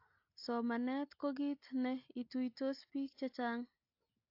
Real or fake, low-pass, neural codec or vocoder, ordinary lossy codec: real; 5.4 kHz; none; AAC, 48 kbps